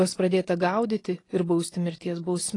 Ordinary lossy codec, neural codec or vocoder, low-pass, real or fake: AAC, 32 kbps; none; 10.8 kHz; real